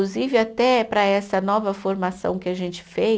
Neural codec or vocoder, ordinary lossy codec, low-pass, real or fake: none; none; none; real